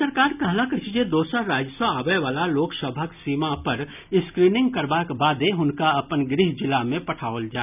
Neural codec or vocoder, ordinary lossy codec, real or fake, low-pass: none; none; real; 3.6 kHz